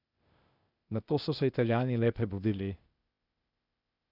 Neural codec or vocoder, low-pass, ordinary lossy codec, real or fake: codec, 16 kHz, 0.8 kbps, ZipCodec; 5.4 kHz; none; fake